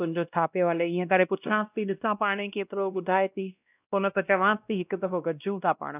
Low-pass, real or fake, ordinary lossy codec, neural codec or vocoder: 3.6 kHz; fake; none; codec, 16 kHz, 1 kbps, X-Codec, WavLM features, trained on Multilingual LibriSpeech